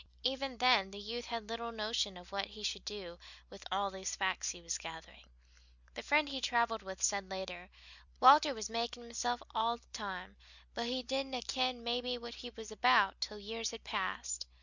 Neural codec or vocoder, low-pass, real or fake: none; 7.2 kHz; real